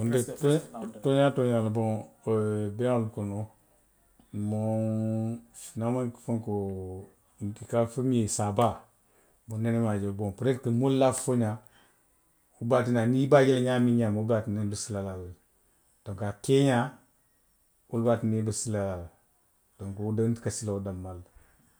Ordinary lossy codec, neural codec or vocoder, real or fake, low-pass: none; none; real; none